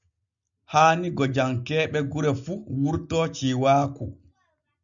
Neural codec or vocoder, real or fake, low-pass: none; real; 7.2 kHz